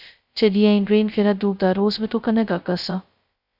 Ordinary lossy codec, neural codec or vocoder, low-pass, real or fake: Opus, 64 kbps; codec, 16 kHz, 0.2 kbps, FocalCodec; 5.4 kHz; fake